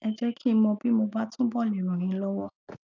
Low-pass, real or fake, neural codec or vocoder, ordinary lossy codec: 7.2 kHz; real; none; none